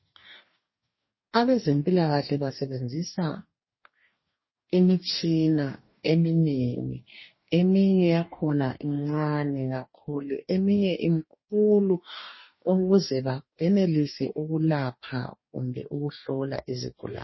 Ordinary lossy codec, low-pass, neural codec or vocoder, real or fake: MP3, 24 kbps; 7.2 kHz; codec, 44.1 kHz, 2.6 kbps, DAC; fake